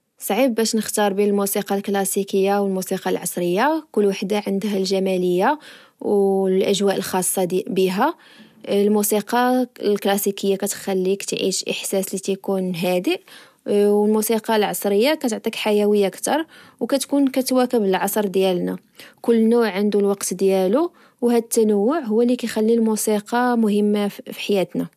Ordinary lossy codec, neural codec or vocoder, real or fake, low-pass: none; none; real; 14.4 kHz